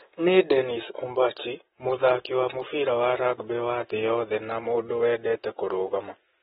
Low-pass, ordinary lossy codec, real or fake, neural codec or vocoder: 19.8 kHz; AAC, 16 kbps; real; none